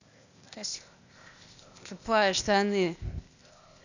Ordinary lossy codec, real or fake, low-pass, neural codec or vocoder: none; fake; 7.2 kHz; codec, 16 kHz, 0.8 kbps, ZipCodec